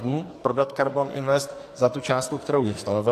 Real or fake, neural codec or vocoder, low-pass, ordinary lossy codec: fake; codec, 44.1 kHz, 2.6 kbps, SNAC; 14.4 kHz; AAC, 64 kbps